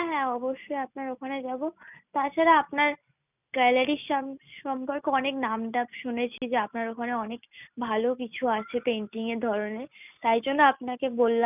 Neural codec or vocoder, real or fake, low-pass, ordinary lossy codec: none; real; 3.6 kHz; none